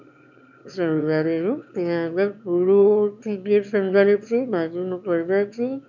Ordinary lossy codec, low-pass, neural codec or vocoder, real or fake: none; 7.2 kHz; autoencoder, 22.05 kHz, a latent of 192 numbers a frame, VITS, trained on one speaker; fake